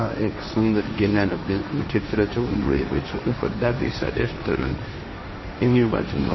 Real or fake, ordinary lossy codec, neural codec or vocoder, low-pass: fake; MP3, 24 kbps; codec, 16 kHz, 1.1 kbps, Voila-Tokenizer; 7.2 kHz